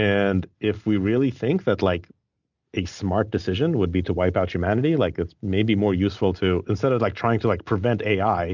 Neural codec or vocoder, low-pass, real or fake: none; 7.2 kHz; real